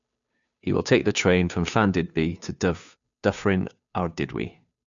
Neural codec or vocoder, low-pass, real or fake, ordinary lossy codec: codec, 16 kHz, 2 kbps, FunCodec, trained on Chinese and English, 25 frames a second; 7.2 kHz; fake; none